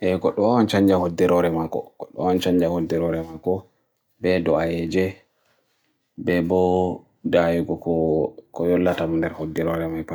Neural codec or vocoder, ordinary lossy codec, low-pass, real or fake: none; none; none; real